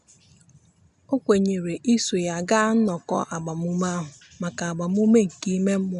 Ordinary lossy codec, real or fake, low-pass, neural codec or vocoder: none; real; 10.8 kHz; none